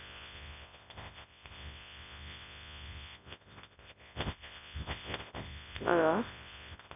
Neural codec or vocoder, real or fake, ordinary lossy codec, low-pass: codec, 24 kHz, 0.9 kbps, WavTokenizer, large speech release; fake; none; 3.6 kHz